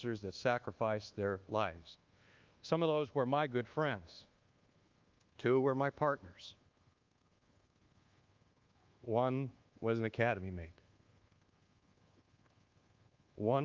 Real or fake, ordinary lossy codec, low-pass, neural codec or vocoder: fake; Opus, 32 kbps; 7.2 kHz; codec, 24 kHz, 1.2 kbps, DualCodec